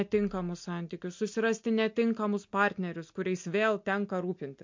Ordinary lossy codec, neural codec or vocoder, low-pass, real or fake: MP3, 48 kbps; none; 7.2 kHz; real